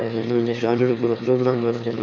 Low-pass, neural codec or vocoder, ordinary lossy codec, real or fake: 7.2 kHz; autoencoder, 22.05 kHz, a latent of 192 numbers a frame, VITS, trained on one speaker; none; fake